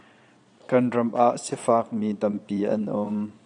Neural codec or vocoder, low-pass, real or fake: vocoder, 22.05 kHz, 80 mel bands, Vocos; 9.9 kHz; fake